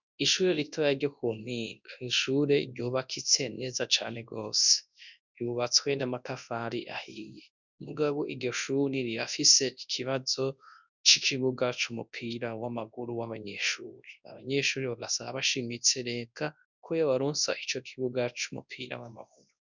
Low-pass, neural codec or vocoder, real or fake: 7.2 kHz; codec, 24 kHz, 0.9 kbps, WavTokenizer, large speech release; fake